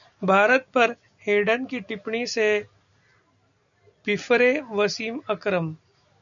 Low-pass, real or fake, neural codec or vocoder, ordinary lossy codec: 7.2 kHz; real; none; MP3, 96 kbps